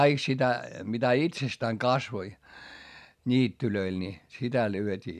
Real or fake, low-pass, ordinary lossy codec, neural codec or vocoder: real; 14.4 kHz; none; none